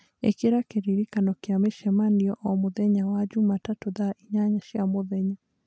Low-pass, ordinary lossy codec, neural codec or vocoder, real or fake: none; none; none; real